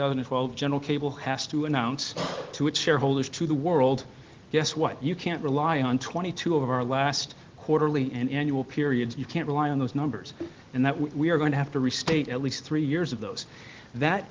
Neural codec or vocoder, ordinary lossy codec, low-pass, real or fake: none; Opus, 16 kbps; 7.2 kHz; real